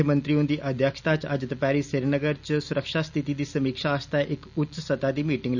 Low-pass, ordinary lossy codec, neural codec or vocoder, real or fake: 7.2 kHz; none; none; real